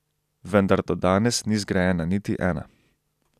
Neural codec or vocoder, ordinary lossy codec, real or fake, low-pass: none; none; real; 14.4 kHz